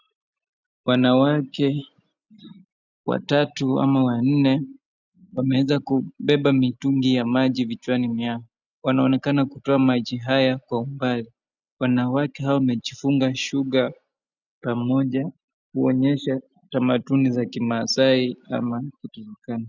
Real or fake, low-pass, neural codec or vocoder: real; 7.2 kHz; none